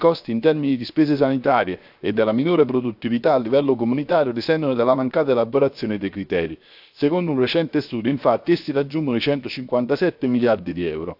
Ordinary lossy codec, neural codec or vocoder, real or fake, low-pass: AAC, 48 kbps; codec, 16 kHz, 0.7 kbps, FocalCodec; fake; 5.4 kHz